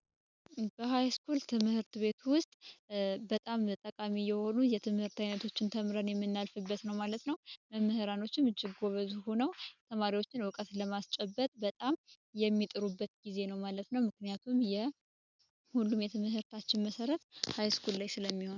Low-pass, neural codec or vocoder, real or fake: 7.2 kHz; none; real